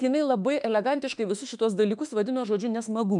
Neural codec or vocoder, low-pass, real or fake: autoencoder, 48 kHz, 32 numbers a frame, DAC-VAE, trained on Japanese speech; 10.8 kHz; fake